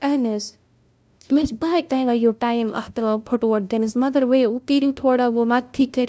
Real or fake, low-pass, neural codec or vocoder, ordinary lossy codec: fake; none; codec, 16 kHz, 0.5 kbps, FunCodec, trained on LibriTTS, 25 frames a second; none